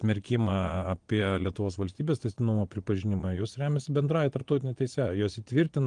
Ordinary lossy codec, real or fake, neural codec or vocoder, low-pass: Opus, 24 kbps; fake; vocoder, 22.05 kHz, 80 mel bands, Vocos; 9.9 kHz